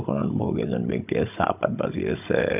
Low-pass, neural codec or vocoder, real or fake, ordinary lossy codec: 3.6 kHz; codec, 16 kHz, 16 kbps, FunCodec, trained on Chinese and English, 50 frames a second; fake; none